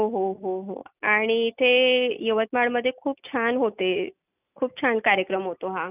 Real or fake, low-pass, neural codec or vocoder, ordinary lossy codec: real; 3.6 kHz; none; none